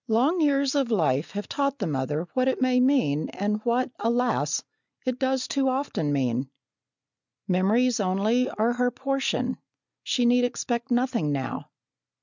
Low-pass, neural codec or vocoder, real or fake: 7.2 kHz; none; real